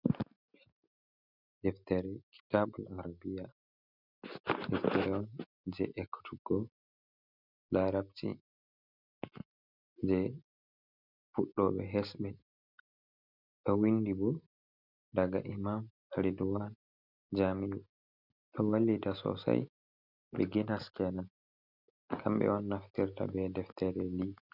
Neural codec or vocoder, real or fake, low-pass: none; real; 5.4 kHz